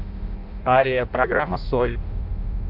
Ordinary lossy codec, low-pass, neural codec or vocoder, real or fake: none; 5.4 kHz; codec, 16 kHz in and 24 kHz out, 0.6 kbps, FireRedTTS-2 codec; fake